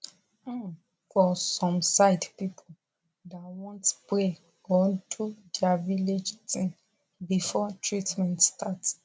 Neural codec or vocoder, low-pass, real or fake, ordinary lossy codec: none; none; real; none